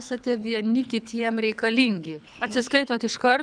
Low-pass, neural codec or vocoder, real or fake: 9.9 kHz; codec, 24 kHz, 3 kbps, HILCodec; fake